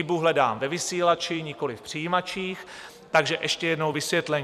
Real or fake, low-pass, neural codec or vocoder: fake; 14.4 kHz; vocoder, 44.1 kHz, 128 mel bands every 256 samples, BigVGAN v2